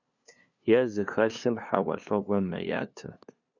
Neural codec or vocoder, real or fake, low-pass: codec, 16 kHz, 2 kbps, FunCodec, trained on LibriTTS, 25 frames a second; fake; 7.2 kHz